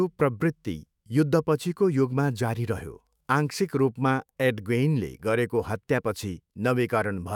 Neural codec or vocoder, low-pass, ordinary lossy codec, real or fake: autoencoder, 48 kHz, 128 numbers a frame, DAC-VAE, trained on Japanese speech; 19.8 kHz; none; fake